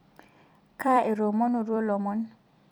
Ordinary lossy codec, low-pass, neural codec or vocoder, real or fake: none; 19.8 kHz; vocoder, 44.1 kHz, 128 mel bands every 256 samples, BigVGAN v2; fake